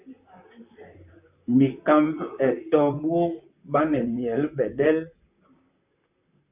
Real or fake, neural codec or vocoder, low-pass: fake; vocoder, 44.1 kHz, 128 mel bands, Pupu-Vocoder; 3.6 kHz